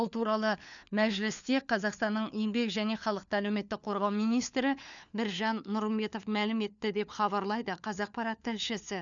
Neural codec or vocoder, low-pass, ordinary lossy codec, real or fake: codec, 16 kHz, 4 kbps, FunCodec, trained on LibriTTS, 50 frames a second; 7.2 kHz; none; fake